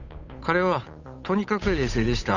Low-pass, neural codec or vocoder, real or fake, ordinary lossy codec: 7.2 kHz; codec, 16 kHz, 8 kbps, FunCodec, trained on Chinese and English, 25 frames a second; fake; none